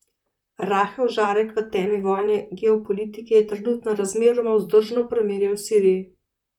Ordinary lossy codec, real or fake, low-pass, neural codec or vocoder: none; fake; 19.8 kHz; vocoder, 44.1 kHz, 128 mel bands, Pupu-Vocoder